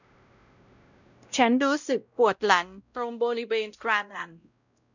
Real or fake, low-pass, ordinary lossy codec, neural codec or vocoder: fake; 7.2 kHz; none; codec, 16 kHz, 0.5 kbps, X-Codec, WavLM features, trained on Multilingual LibriSpeech